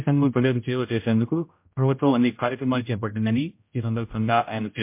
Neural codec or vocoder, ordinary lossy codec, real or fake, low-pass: codec, 16 kHz, 0.5 kbps, X-Codec, HuBERT features, trained on general audio; MP3, 32 kbps; fake; 3.6 kHz